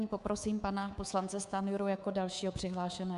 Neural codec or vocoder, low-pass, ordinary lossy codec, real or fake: codec, 24 kHz, 3.1 kbps, DualCodec; 10.8 kHz; MP3, 96 kbps; fake